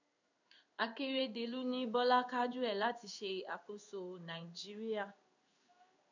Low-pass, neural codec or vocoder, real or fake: 7.2 kHz; codec, 16 kHz in and 24 kHz out, 1 kbps, XY-Tokenizer; fake